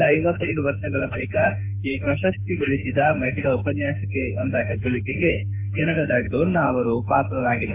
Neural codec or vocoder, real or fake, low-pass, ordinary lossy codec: autoencoder, 48 kHz, 32 numbers a frame, DAC-VAE, trained on Japanese speech; fake; 3.6 kHz; AAC, 32 kbps